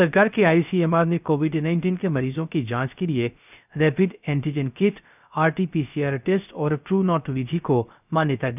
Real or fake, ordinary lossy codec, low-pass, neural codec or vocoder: fake; none; 3.6 kHz; codec, 16 kHz, 0.3 kbps, FocalCodec